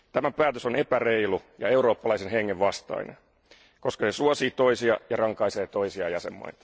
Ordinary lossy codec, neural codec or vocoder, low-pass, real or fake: none; none; none; real